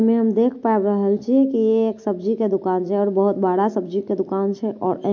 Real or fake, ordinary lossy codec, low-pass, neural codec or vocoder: real; MP3, 48 kbps; 7.2 kHz; none